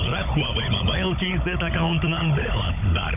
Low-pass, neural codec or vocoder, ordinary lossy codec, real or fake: 3.6 kHz; codec, 16 kHz, 16 kbps, FunCodec, trained on Chinese and English, 50 frames a second; none; fake